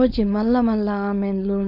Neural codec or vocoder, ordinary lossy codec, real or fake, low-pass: codec, 16 kHz, 4.8 kbps, FACodec; none; fake; 5.4 kHz